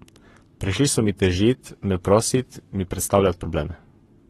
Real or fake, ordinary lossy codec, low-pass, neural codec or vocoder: fake; AAC, 32 kbps; 19.8 kHz; codec, 44.1 kHz, 7.8 kbps, Pupu-Codec